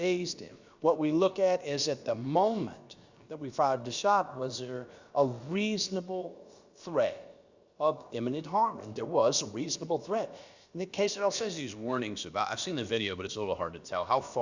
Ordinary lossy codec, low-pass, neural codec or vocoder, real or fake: Opus, 64 kbps; 7.2 kHz; codec, 16 kHz, about 1 kbps, DyCAST, with the encoder's durations; fake